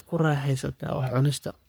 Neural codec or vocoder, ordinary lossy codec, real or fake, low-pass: codec, 44.1 kHz, 3.4 kbps, Pupu-Codec; none; fake; none